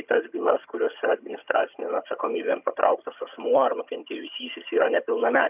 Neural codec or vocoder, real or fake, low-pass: vocoder, 22.05 kHz, 80 mel bands, HiFi-GAN; fake; 3.6 kHz